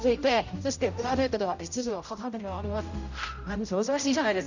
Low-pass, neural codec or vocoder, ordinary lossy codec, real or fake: 7.2 kHz; codec, 16 kHz, 0.5 kbps, X-Codec, HuBERT features, trained on general audio; none; fake